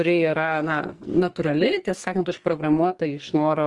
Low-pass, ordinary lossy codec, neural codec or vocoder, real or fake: 10.8 kHz; Opus, 24 kbps; codec, 44.1 kHz, 2.6 kbps, SNAC; fake